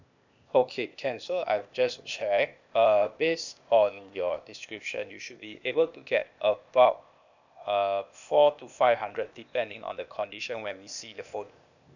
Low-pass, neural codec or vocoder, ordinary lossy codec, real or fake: 7.2 kHz; codec, 16 kHz, 0.8 kbps, ZipCodec; none; fake